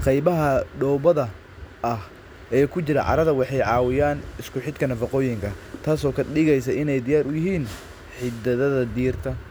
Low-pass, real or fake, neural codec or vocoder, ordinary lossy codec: none; real; none; none